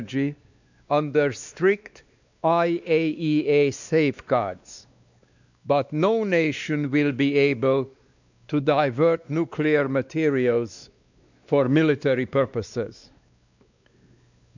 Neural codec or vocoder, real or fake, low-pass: codec, 16 kHz, 2 kbps, X-Codec, WavLM features, trained on Multilingual LibriSpeech; fake; 7.2 kHz